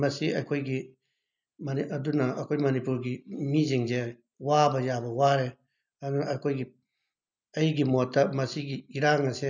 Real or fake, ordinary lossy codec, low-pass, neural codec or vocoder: real; none; 7.2 kHz; none